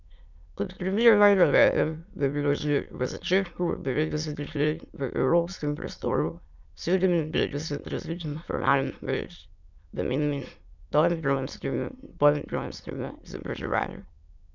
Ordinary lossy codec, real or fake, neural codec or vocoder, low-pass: none; fake; autoencoder, 22.05 kHz, a latent of 192 numbers a frame, VITS, trained on many speakers; 7.2 kHz